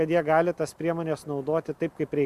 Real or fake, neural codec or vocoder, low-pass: real; none; 14.4 kHz